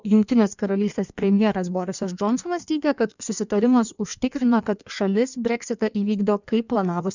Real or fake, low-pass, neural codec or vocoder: fake; 7.2 kHz; codec, 16 kHz in and 24 kHz out, 1.1 kbps, FireRedTTS-2 codec